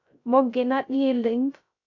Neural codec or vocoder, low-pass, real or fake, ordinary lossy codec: codec, 16 kHz, 0.3 kbps, FocalCodec; 7.2 kHz; fake; MP3, 64 kbps